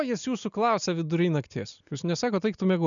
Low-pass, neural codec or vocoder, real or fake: 7.2 kHz; none; real